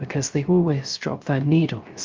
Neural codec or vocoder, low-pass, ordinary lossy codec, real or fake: codec, 16 kHz, 0.3 kbps, FocalCodec; 7.2 kHz; Opus, 32 kbps; fake